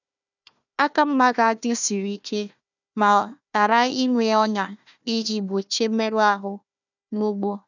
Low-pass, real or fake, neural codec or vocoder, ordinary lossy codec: 7.2 kHz; fake; codec, 16 kHz, 1 kbps, FunCodec, trained on Chinese and English, 50 frames a second; none